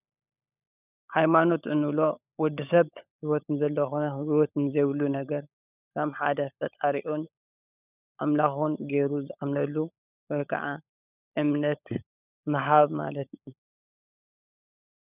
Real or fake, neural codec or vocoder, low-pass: fake; codec, 16 kHz, 16 kbps, FunCodec, trained on LibriTTS, 50 frames a second; 3.6 kHz